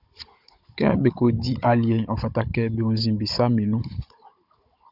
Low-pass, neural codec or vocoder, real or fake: 5.4 kHz; codec, 16 kHz, 16 kbps, FunCodec, trained on Chinese and English, 50 frames a second; fake